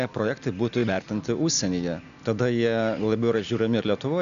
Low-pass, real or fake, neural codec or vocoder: 7.2 kHz; real; none